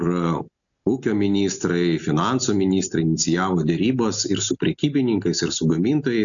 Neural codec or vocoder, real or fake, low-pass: none; real; 7.2 kHz